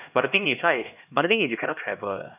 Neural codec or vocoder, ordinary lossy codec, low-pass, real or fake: codec, 16 kHz, 1 kbps, X-Codec, HuBERT features, trained on LibriSpeech; none; 3.6 kHz; fake